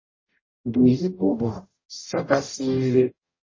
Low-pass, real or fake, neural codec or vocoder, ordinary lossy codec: 7.2 kHz; fake; codec, 44.1 kHz, 0.9 kbps, DAC; MP3, 32 kbps